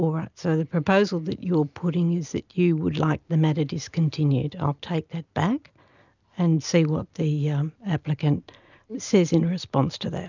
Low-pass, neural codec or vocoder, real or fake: 7.2 kHz; none; real